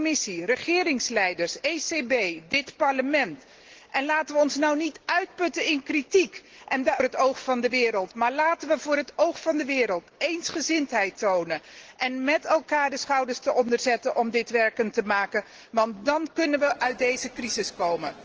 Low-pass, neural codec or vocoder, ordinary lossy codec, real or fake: 7.2 kHz; none; Opus, 16 kbps; real